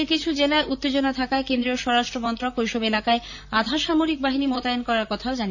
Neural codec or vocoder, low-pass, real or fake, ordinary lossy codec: vocoder, 44.1 kHz, 128 mel bands, Pupu-Vocoder; 7.2 kHz; fake; none